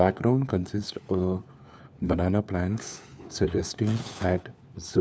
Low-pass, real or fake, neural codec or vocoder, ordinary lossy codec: none; fake; codec, 16 kHz, 4 kbps, FunCodec, trained on LibriTTS, 50 frames a second; none